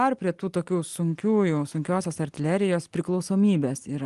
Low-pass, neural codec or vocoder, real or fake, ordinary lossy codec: 10.8 kHz; none; real; Opus, 24 kbps